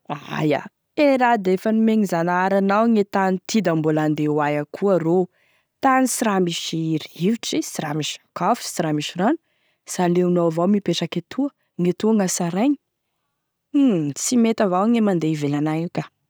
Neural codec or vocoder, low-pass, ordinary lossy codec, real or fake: none; none; none; real